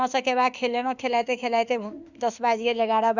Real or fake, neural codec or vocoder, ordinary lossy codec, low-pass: fake; autoencoder, 48 kHz, 32 numbers a frame, DAC-VAE, trained on Japanese speech; Opus, 64 kbps; 7.2 kHz